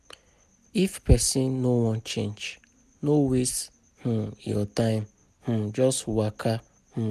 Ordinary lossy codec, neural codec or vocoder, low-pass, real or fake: none; none; 14.4 kHz; real